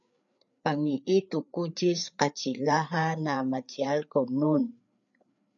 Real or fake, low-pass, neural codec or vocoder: fake; 7.2 kHz; codec, 16 kHz, 4 kbps, FreqCodec, larger model